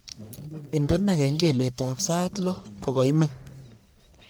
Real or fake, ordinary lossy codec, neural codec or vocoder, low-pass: fake; none; codec, 44.1 kHz, 1.7 kbps, Pupu-Codec; none